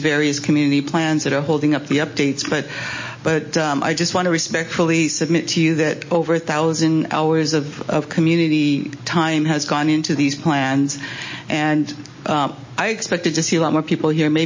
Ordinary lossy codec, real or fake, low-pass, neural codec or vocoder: MP3, 32 kbps; real; 7.2 kHz; none